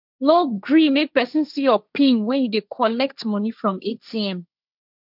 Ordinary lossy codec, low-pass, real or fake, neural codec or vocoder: none; 5.4 kHz; fake; codec, 16 kHz, 1.1 kbps, Voila-Tokenizer